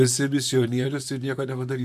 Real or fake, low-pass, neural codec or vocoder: fake; 14.4 kHz; vocoder, 44.1 kHz, 128 mel bands, Pupu-Vocoder